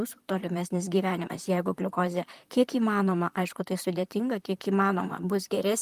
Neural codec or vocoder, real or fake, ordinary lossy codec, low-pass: vocoder, 44.1 kHz, 128 mel bands, Pupu-Vocoder; fake; Opus, 32 kbps; 14.4 kHz